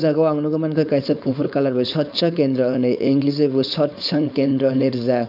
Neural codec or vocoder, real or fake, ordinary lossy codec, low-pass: codec, 16 kHz, 4.8 kbps, FACodec; fake; none; 5.4 kHz